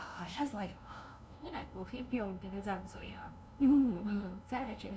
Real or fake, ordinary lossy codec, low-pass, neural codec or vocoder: fake; none; none; codec, 16 kHz, 0.5 kbps, FunCodec, trained on LibriTTS, 25 frames a second